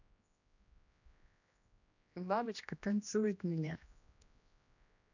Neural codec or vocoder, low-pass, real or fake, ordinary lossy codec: codec, 16 kHz, 1 kbps, X-Codec, HuBERT features, trained on general audio; 7.2 kHz; fake; none